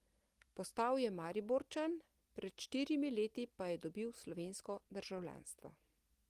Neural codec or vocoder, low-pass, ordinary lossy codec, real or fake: none; 14.4 kHz; Opus, 24 kbps; real